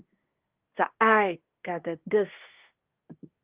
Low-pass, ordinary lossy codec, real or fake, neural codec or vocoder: 3.6 kHz; Opus, 24 kbps; fake; codec, 24 kHz, 1 kbps, SNAC